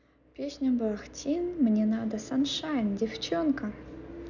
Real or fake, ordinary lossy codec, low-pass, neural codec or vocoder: real; none; 7.2 kHz; none